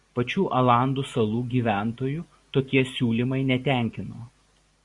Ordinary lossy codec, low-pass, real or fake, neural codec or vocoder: Opus, 64 kbps; 10.8 kHz; real; none